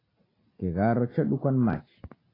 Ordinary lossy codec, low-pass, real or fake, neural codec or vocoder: AAC, 24 kbps; 5.4 kHz; real; none